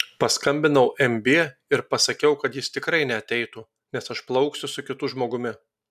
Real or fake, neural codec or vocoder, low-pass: real; none; 14.4 kHz